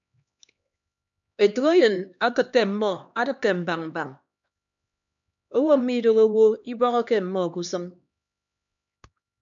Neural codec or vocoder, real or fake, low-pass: codec, 16 kHz, 2 kbps, X-Codec, HuBERT features, trained on LibriSpeech; fake; 7.2 kHz